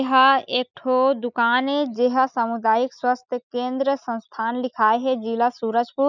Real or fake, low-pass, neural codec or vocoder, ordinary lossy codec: real; 7.2 kHz; none; none